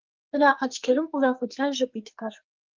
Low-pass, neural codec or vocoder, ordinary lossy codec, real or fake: 7.2 kHz; codec, 44.1 kHz, 2.6 kbps, SNAC; Opus, 24 kbps; fake